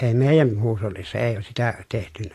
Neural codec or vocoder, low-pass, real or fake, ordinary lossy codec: none; 14.4 kHz; real; AAC, 48 kbps